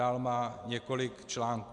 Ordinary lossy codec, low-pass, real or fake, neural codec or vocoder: Opus, 64 kbps; 10.8 kHz; real; none